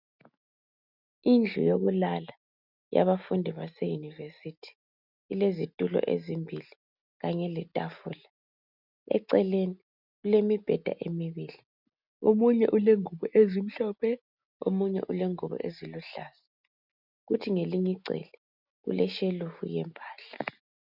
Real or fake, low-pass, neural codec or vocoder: real; 5.4 kHz; none